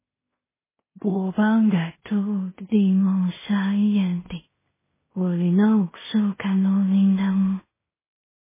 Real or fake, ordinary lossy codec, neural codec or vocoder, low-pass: fake; MP3, 16 kbps; codec, 16 kHz in and 24 kHz out, 0.4 kbps, LongCat-Audio-Codec, two codebook decoder; 3.6 kHz